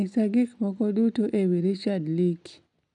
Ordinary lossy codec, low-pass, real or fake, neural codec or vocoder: none; 10.8 kHz; real; none